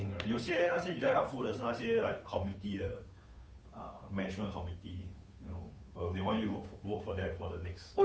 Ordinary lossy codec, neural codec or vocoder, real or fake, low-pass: none; codec, 16 kHz, 2 kbps, FunCodec, trained on Chinese and English, 25 frames a second; fake; none